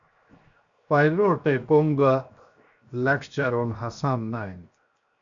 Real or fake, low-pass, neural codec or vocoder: fake; 7.2 kHz; codec, 16 kHz, 0.7 kbps, FocalCodec